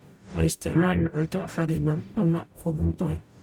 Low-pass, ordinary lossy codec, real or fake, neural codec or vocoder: 19.8 kHz; none; fake; codec, 44.1 kHz, 0.9 kbps, DAC